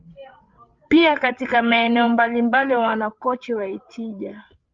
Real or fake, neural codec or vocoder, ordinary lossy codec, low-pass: fake; codec, 16 kHz, 8 kbps, FreqCodec, larger model; Opus, 32 kbps; 7.2 kHz